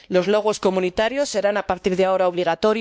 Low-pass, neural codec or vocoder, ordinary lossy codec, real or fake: none; codec, 16 kHz, 2 kbps, X-Codec, WavLM features, trained on Multilingual LibriSpeech; none; fake